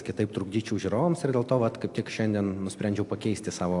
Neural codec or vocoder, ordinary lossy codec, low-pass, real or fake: none; AAC, 64 kbps; 10.8 kHz; real